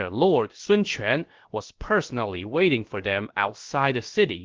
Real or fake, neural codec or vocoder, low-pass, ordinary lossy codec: fake; codec, 16 kHz, about 1 kbps, DyCAST, with the encoder's durations; 7.2 kHz; Opus, 16 kbps